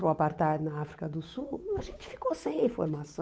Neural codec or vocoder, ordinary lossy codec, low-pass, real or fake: codec, 16 kHz, 8 kbps, FunCodec, trained on Chinese and English, 25 frames a second; none; none; fake